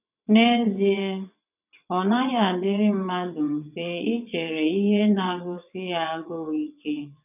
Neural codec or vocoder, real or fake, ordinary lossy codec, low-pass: vocoder, 22.05 kHz, 80 mel bands, WaveNeXt; fake; none; 3.6 kHz